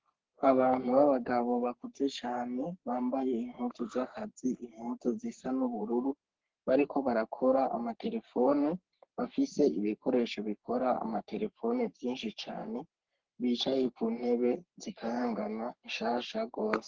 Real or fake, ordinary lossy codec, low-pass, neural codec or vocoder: fake; Opus, 16 kbps; 7.2 kHz; codec, 44.1 kHz, 3.4 kbps, Pupu-Codec